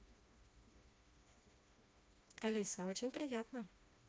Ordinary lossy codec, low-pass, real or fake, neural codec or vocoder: none; none; fake; codec, 16 kHz, 2 kbps, FreqCodec, smaller model